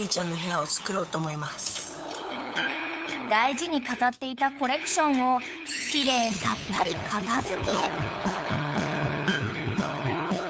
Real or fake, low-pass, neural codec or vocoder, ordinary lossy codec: fake; none; codec, 16 kHz, 8 kbps, FunCodec, trained on LibriTTS, 25 frames a second; none